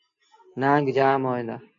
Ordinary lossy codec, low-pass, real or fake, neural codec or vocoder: AAC, 48 kbps; 7.2 kHz; real; none